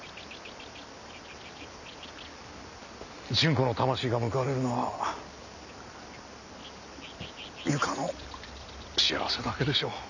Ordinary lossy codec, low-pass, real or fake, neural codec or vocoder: none; 7.2 kHz; real; none